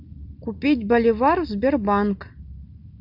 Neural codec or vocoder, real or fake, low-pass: none; real; 5.4 kHz